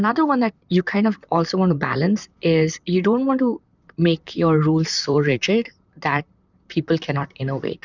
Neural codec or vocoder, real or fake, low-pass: vocoder, 44.1 kHz, 128 mel bands, Pupu-Vocoder; fake; 7.2 kHz